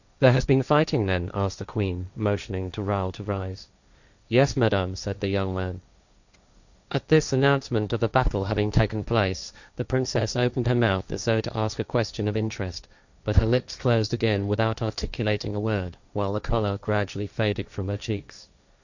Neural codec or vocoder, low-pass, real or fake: codec, 16 kHz, 1.1 kbps, Voila-Tokenizer; 7.2 kHz; fake